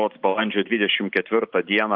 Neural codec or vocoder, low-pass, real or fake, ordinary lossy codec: vocoder, 44.1 kHz, 128 mel bands every 512 samples, BigVGAN v2; 5.4 kHz; fake; Opus, 32 kbps